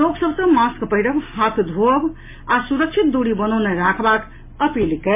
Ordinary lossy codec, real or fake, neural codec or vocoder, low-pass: MP3, 24 kbps; real; none; 3.6 kHz